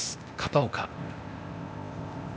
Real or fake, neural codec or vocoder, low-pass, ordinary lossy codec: fake; codec, 16 kHz, 0.8 kbps, ZipCodec; none; none